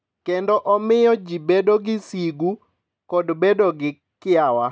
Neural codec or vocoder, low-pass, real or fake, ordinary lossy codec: none; none; real; none